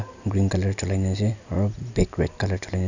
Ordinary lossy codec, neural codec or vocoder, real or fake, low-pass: none; none; real; 7.2 kHz